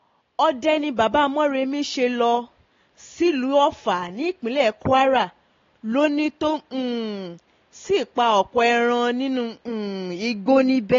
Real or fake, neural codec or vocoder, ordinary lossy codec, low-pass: real; none; AAC, 32 kbps; 7.2 kHz